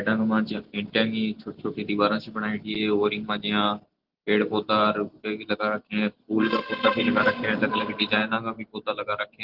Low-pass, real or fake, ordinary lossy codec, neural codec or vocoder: 5.4 kHz; real; Opus, 16 kbps; none